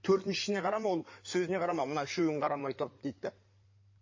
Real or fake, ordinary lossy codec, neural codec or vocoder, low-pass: fake; MP3, 32 kbps; codec, 16 kHz in and 24 kHz out, 2.2 kbps, FireRedTTS-2 codec; 7.2 kHz